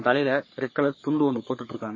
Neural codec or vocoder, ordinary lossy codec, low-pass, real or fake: codec, 44.1 kHz, 3.4 kbps, Pupu-Codec; MP3, 32 kbps; 7.2 kHz; fake